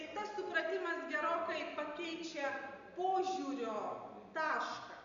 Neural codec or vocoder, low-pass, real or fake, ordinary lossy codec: none; 7.2 kHz; real; MP3, 64 kbps